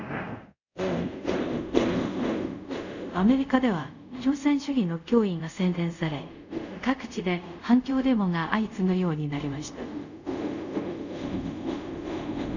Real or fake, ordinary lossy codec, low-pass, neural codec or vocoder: fake; none; 7.2 kHz; codec, 24 kHz, 0.5 kbps, DualCodec